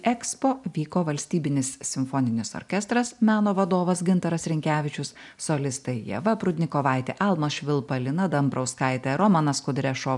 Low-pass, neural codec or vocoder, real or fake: 10.8 kHz; none; real